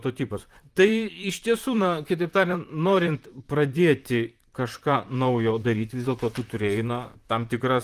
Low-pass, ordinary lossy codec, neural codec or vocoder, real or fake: 14.4 kHz; Opus, 32 kbps; vocoder, 44.1 kHz, 128 mel bands, Pupu-Vocoder; fake